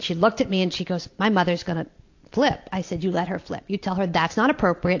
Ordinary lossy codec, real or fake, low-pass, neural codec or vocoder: AAC, 48 kbps; real; 7.2 kHz; none